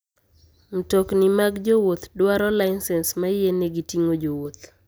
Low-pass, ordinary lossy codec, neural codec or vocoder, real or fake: none; none; none; real